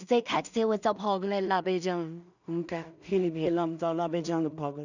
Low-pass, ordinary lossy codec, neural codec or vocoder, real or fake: 7.2 kHz; none; codec, 16 kHz in and 24 kHz out, 0.4 kbps, LongCat-Audio-Codec, two codebook decoder; fake